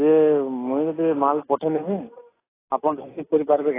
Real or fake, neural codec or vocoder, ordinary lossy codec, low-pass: real; none; AAC, 16 kbps; 3.6 kHz